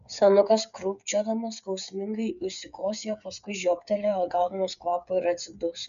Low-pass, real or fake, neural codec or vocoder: 7.2 kHz; fake; codec, 16 kHz, 8 kbps, FreqCodec, smaller model